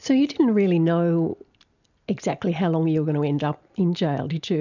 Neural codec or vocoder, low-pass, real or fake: none; 7.2 kHz; real